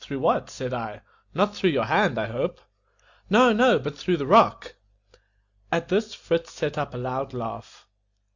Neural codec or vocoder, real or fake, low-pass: none; real; 7.2 kHz